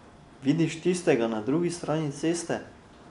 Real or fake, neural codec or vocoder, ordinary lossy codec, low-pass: real; none; none; 10.8 kHz